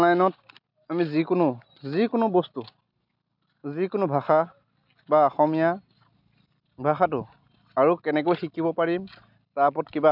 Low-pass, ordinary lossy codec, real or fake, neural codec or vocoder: 5.4 kHz; none; real; none